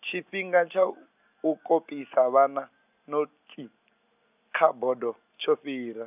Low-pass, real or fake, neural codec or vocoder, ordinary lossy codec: 3.6 kHz; real; none; none